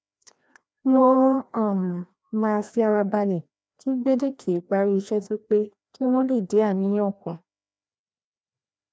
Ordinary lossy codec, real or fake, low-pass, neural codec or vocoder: none; fake; none; codec, 16 kHz, 1 kbps, FreqCodec, larger model